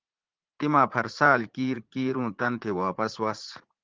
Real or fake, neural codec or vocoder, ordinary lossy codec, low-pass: fake; vocoder, 44.1 kHz, 80 mel bands, Vocos; Opus, 16 kbps; 7.2 kHz